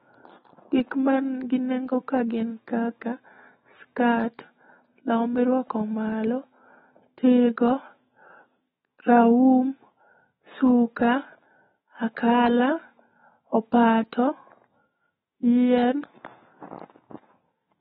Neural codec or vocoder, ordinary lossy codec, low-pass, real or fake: autoencoder, 48 kHz, 128 numbers a frame, DAC-VAE, trained on Japanese speech; AAC, 16 kbps; 19.8 kHz; fake